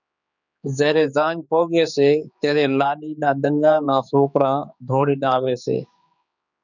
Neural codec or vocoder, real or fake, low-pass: codec, 16 kHz, 4 kbps, X-Codec, HuBERT features, trained on general audio; fake; 7.2 kHz